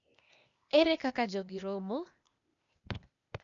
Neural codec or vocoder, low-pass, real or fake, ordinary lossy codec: codec, 16 kHz, 0.8 kbps, ZipCodec; 7.2 kHz; fake; none